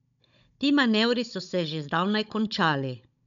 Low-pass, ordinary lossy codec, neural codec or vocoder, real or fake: 7.2 kHz; none; codec, 16 kHz, 16 kbps, FunCodec, trained on Chinese and English, 50 frames a second; fake